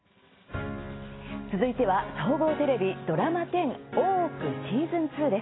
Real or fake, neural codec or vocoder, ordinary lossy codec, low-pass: real; none; AAC, 16 kbps; 7.2 kHz